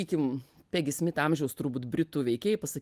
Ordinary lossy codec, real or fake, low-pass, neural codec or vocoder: Opus, 32 kbps; real; 14.4 kHz; none